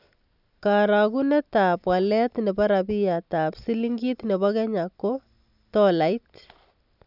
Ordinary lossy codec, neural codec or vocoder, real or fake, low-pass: none; none; real; 5.4 kHz